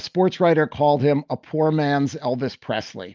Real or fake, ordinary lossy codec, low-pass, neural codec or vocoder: real; Opus, 24 kbps; 7.2 kHz; none